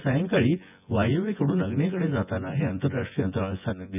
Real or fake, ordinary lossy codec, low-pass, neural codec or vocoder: fake; none; 3.6 kHz; vocoder, 24 kHz, 100 mel bands, Vocos